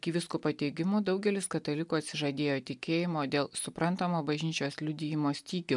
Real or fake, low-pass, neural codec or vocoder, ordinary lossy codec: real; 10.8 kHz; none; MP3, 96 kbps